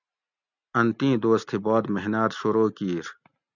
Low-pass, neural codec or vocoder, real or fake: 7.2 kHz; none; real